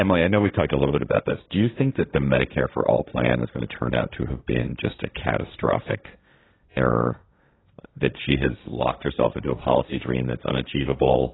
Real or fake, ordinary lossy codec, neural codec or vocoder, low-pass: fake; AAC, 16 kbps; codec, 16 kHz, 1.1 kbps, Voila-Tokenizer; 7.2 kHz